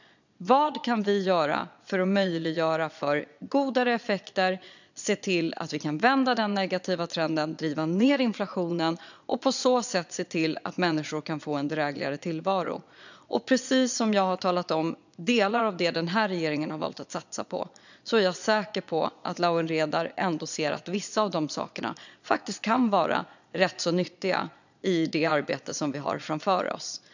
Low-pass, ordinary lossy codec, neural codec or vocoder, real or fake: 7.2 kHz; none; vocoder, 22.05 kHz, 80 mel bands, Vocos; fake